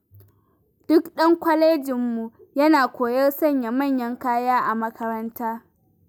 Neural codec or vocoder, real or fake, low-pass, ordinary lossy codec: none; real; none; none